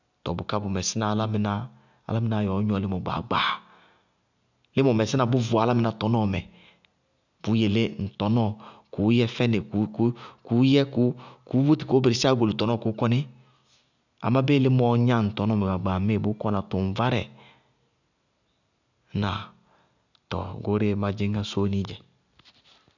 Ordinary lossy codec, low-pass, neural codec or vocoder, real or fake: none; 7.2 kHz; none; real